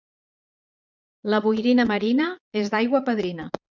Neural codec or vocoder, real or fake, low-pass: vocoder, 22.05 kHz, 80 mel bands, Vocos; fake; 7.2 kHz